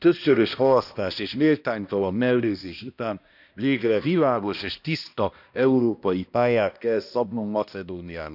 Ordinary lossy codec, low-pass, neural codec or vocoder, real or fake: none; 5.4 kHz; codec, 16 kHz, 1 kbps, X-Codec, HuBERT features, trained on balanced general audio; fake